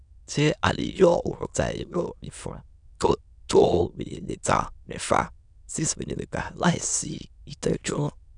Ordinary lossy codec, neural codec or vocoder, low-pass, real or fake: none; autoencoder, 22.05 kHz, a latent of 192 numbers a frame, VITS, trained on many speakers; 9.9 kHz; fake